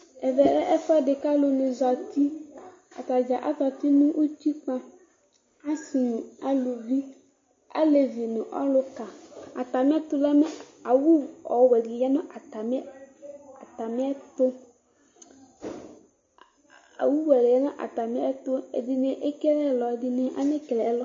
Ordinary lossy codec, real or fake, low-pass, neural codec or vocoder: MP3, 32 kbps; real; 7.2 kHz; none